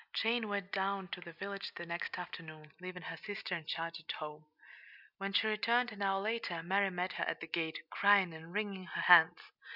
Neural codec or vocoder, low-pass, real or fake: none; 5.4 kHz; real